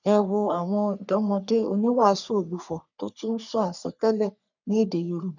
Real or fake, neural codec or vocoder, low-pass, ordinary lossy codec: fake; codec, 44.1 kHz, 3.4 kbps, Pupu-Codec; 7.2 kHz; none